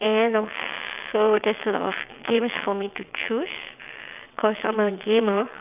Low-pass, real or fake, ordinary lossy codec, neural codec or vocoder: 3.6 kHz; fake; none; vocoder, 22.05 kHz, 80 mel bands, WaveNeXt